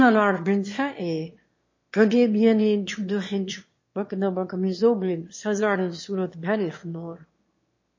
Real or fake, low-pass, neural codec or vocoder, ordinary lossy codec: fake; 7.2 kHz; autoencoder, 22.05 kHz, a latent of 192 numbers a frame, VITS, trained on one speaker; MP3, 32 kbps